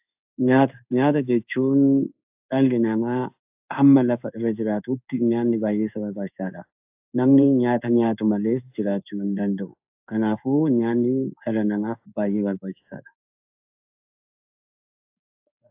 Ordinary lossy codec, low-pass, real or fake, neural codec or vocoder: AAC, 32 kbps; 3.6 kHz; fake; codec, 16 kHz in and 24 kHz out, 1 kbps, XY-Tokenizer